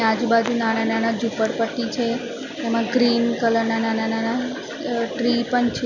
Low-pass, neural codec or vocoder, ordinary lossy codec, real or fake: 7.2 kHz; none; none; real